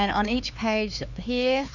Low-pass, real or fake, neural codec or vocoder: 7.2 kHz; fake; codec, 16 kHz, 4 kbps, X-Codec, HuBERT features, trained on LibriSpeech